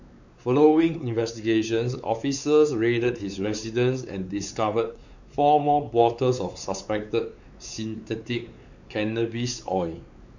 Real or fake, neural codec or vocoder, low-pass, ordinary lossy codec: fake; codec, 16 kHz, 4 kbps, X-Codec, WavLM features, trained on Multilingual LibriSpeech; 7.2 kHz; none